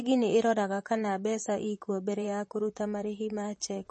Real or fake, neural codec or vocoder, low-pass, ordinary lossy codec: fake; vocoder, 44.1 kHz, 128 mel bands, Pupu-Vocoder; 10.8 kHz; MP3, 32 kbps